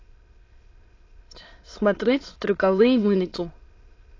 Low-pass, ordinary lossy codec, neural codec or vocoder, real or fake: 7.2 kHz; AAC, 32 kbps; autoencoder, 22.05 kHz, a latent of 192 numbers a frame, VITS, trained on many speakers; fake